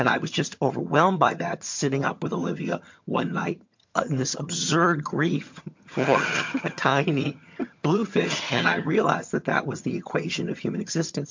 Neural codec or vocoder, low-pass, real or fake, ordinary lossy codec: vocoder, 22.05 kHz, 80 mel bands, HiFi-GAN; 7.2 kHz; fake; MP3, 48 kbps